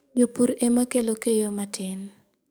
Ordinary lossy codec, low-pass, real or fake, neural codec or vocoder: none; none; fake; codec, 44.1 kHz, 7.8 kbps, DAC